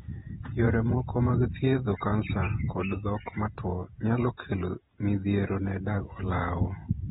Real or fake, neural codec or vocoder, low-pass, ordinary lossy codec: fake; vocoder, 48 kHz, 128 mel bands, Vocos; 19.8 kHz; AAC, 16 kbps